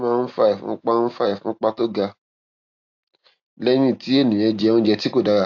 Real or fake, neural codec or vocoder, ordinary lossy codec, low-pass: real; none; AAC, 48 kbps; 7.2 kHz